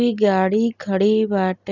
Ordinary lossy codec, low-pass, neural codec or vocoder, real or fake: none; 7.2 kHz; none; real